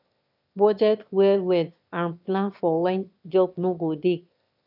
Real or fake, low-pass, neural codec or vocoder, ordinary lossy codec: fake; 5.4 kHz; autoencoder, 22.05 kHz, a latent of 192 numbers a frame, VITS, trained on one speaker; none